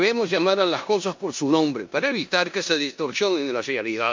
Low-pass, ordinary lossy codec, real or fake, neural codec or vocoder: 7.2 kHz; MP3, 48 kbps; fake; codec, 16 kHz in and 24 kHz out, 0.9 kbps, LongCat-Audio-Codec, four codebook decoder